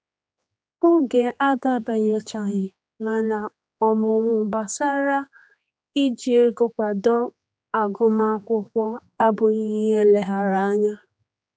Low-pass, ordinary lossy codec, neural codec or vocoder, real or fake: none; none; codec, 16 kHz, 2 kbps, X-Codec, HuBERT features, trained on general audio; fake